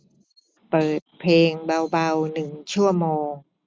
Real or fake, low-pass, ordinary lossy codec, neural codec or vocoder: real; none; none; none